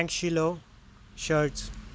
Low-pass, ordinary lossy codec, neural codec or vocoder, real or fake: none; none; none; real